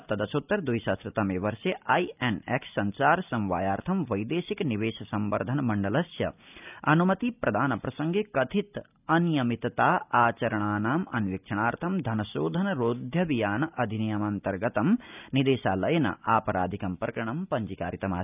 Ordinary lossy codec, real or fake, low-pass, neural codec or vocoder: none; real; 3.6 kHz; none